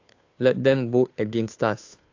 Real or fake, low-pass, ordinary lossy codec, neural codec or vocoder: fake; 7.2 kHz; AAC, 48 kbps; codec, 16 kHz, 2 kbps, FunCodec, trained on Chinese and English, 25 frames a second